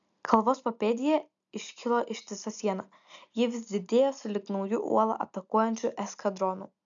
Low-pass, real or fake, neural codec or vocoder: 7.2 kHz; real; none